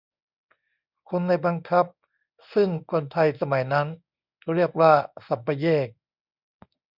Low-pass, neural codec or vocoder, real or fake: 5.4 kHz; codec, 24 kHz, 0.9 kbps, WavTokenizer, medium speech release version 1; fake